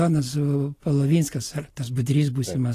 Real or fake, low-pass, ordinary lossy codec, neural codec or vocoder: real; 14.4 kHz; AAC, 48 kbps; none